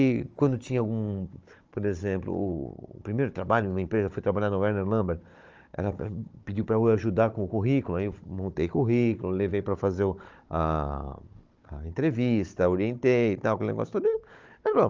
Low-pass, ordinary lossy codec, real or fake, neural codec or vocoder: 7.2 kHz; Opus, 24 kbps; fake; codec, 16 kHz, 16 kbps, FunCodec, trained on Chinese and English, 50 frames a second